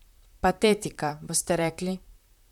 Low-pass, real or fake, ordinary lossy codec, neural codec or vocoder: 19.8 kHz; fake; none; vocoder, 44.1 kHz, 128 mel bands, Pupu-Vocoder